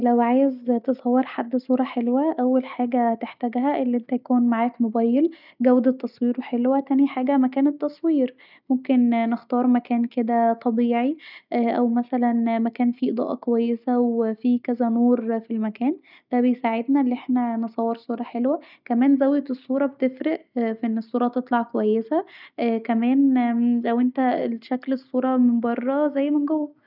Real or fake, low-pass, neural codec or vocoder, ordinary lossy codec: real; 5.4 kHz; none; none